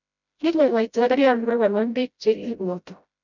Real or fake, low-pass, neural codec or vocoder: fake; 7.2 kHz; codec, 16 kHz, 0.5 kbps, FreqCodec, smaller model